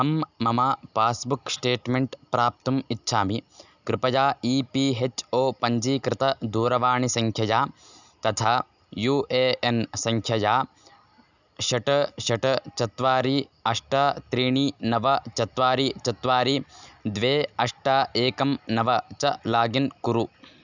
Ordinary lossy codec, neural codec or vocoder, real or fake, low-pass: none; none; real; 7.2 kHz